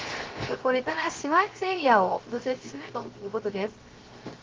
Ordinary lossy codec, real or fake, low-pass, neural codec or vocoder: Opus, 16 kbps; fake; 7.2 kHz; codec, 16 kHz, 0.3 kbps, FocalCodec